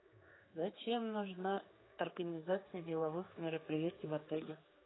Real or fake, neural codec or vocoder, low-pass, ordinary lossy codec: fake; codec, 16 kHz, 4 kbps, X-Codec, HuBERT features, trained on general audio; 7.2 kHz; AAC, 16 kbps